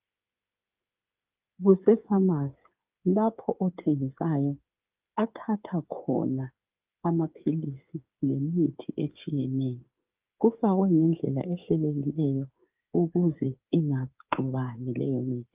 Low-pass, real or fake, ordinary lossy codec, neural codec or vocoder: 3.6 kHz; fake; Opus, 24 kbps; codec, 16 kHz, 8 kbps, FreqCodec, smaller model